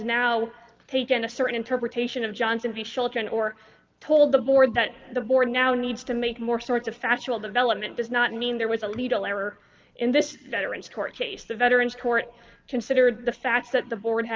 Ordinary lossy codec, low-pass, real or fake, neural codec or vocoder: Opus, 32 kbps; 7.2 kHz; real; none